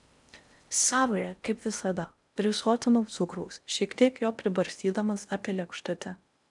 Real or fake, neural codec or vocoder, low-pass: fake; codec, 16 kHz in and 24 kHz out, 0.6 kbps, FocalCodec, streaming, 4096 codes; 10.8 kHz